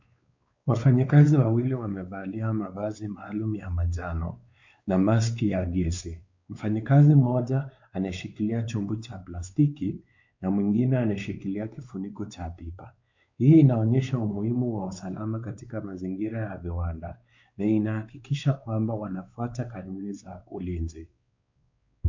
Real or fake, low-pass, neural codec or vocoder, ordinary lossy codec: fake; 7.2 kHz; codec, 16 kHz, 4 kbps, X-Codec, WavLM features, trained on Multilingual LibriSpeech; MP3, 48 kbps